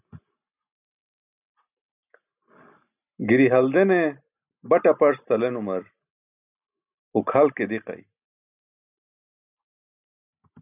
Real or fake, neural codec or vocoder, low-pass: real; none; 3.6 kHz